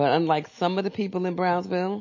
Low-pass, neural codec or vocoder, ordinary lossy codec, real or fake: 7.2 kHz; none; MP3, 32 kbps; real